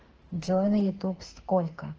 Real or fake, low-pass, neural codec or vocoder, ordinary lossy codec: fake; 7.2 kHz; autoencoder, 48 kHz, 32 numbers a frame, DAC-VAE, trained on Japanese speech; Opus, 16 kbps